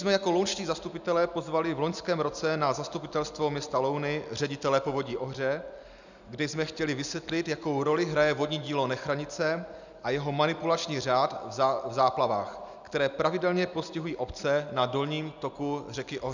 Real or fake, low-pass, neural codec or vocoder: real; 7.2 kHz; none